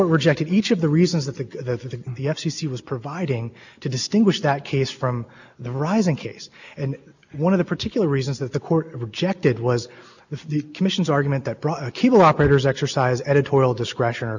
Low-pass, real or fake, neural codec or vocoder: 7.2 kHz; real; none